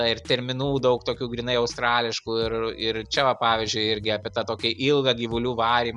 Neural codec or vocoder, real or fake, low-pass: none; real; 10.8 kHz